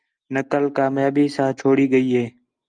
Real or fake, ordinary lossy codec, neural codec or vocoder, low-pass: real; Opus, 32 kbps; none; 9.9 kHz